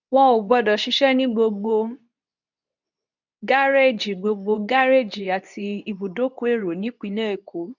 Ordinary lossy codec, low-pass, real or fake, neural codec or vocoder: none; 7.2 kHz; fake; codec, 24 kHz, 0.9 kbps, WavTokenizer, medium speech release version 2